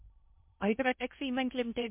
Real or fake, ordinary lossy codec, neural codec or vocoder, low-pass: fake; MP3, 32 kbps; codec, 16 kHz in and 24 kHz out, 0.6 kbps, FocalCodec, streaming, 4096 codes; 3.6 kHz